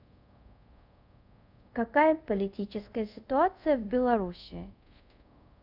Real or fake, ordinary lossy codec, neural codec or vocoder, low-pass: fake; none; codec, 24 kHz, 0.5 kbps, DualCodec; 5.4 kHz